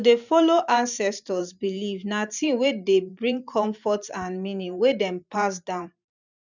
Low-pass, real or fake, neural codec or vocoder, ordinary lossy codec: 7.2 kHz; fake; vocoder, 44.1 kHz, 128 mel bands every 512 samples, BigVGAN v2; none